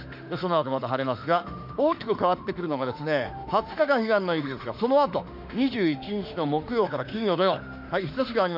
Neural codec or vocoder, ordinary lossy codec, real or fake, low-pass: autoencoder, 48 kHz, 32 numbers a frame, DAC-VAE, trained on Japanese speech; none; fake; 5.4 kHz